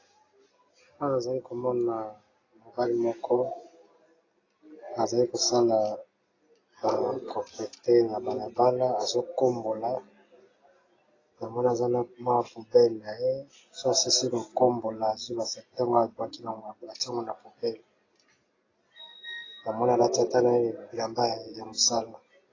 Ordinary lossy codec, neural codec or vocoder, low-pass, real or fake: AAC, 32 kbps; none; 7.2 kHz; real